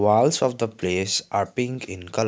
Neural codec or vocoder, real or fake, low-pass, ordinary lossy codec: none; real; none; none